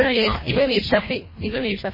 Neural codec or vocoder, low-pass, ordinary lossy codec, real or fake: codec, 24 kHz, 1.5 kbps, HILCodec; 5.4 kHz; MP3, 24 kbps; fake